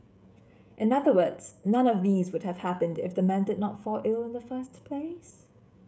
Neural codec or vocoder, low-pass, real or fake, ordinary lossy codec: codec, 16 kHz, 16 kbps, FreqCodec, smaller model; none; fake; none